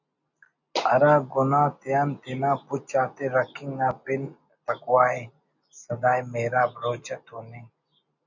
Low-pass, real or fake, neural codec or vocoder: 7.2 kHz; real; none